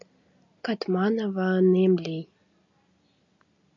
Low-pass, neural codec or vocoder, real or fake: 7.2 kHz; none; real